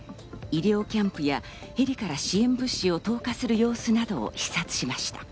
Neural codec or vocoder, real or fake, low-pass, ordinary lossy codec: none; real; none; none